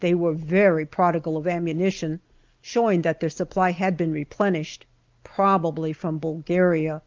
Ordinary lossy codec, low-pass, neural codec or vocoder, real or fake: Opus, 32 kbps; 7.2 kHz; none; real